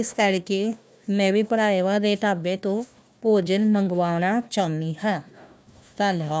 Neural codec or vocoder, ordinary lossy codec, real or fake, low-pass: codec, 16 kHz, 1 kbps, FunCodec, trained on Chinese and English, 50 frames a second; none; fake; none